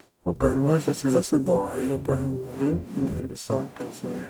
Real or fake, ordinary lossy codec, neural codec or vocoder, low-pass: fake; none; codec, 44.1 kHz, 0.9 kbps, DAC; none